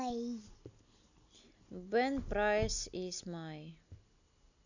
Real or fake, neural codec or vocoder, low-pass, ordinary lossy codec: real; none; 7.2 kHz; none